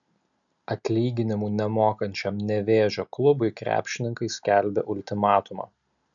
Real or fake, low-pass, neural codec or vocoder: real; 7.2 kHz; none